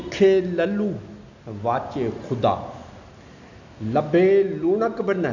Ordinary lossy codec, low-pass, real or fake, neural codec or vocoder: none; 7.2 kHz; real; none